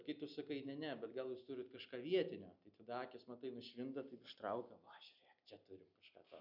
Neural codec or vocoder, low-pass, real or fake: none; 5.4 kHz; real